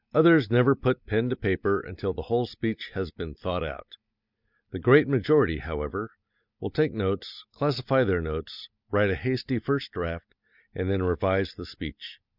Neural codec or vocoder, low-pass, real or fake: none; 5.4 kHz; real